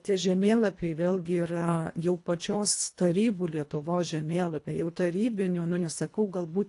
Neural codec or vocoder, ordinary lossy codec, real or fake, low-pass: codec, 24 kHz, 1.5 kbps, HILCodec; AAC, 48 kbps; fake; 10.8 kHz